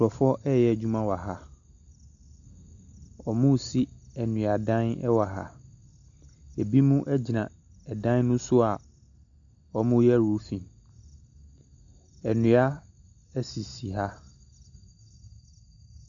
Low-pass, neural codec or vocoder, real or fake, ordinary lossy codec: 7.2 kHz; none; real; AAC, 64 kbps